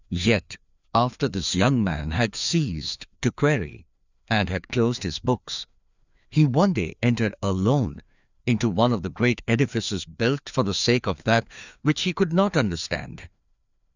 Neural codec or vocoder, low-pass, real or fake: codec, 16 kHz, 2 kbps, FreqCodec, larger model; 7.2 kHz; fake